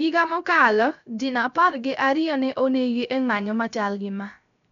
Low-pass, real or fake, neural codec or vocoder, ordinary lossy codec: 7.2 kHz; fake; codec, 16 kHz, 0.3 kbps, FocalCodec; none